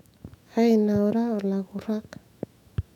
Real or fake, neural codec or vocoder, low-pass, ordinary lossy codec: fake; autoencoder, 48 kHz, 128 numbers a frame, DAC-VAE, trained on Japanese speech; 19.8 kHz; none